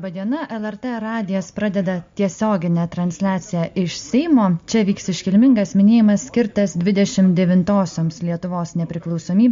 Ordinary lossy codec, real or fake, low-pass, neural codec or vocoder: MP3, 48 kbps; real; 7.2 kHz; none